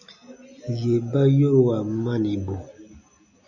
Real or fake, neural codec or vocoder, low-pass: real; none; 7.2 kHz